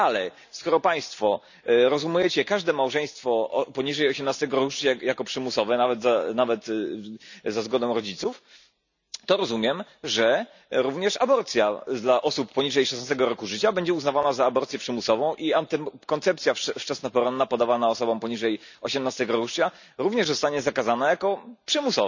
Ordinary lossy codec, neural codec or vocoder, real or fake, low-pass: none; none; real; 7.2 kHz